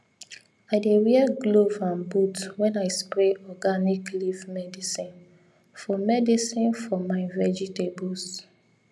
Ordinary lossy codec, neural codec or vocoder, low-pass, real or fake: none; none; none; real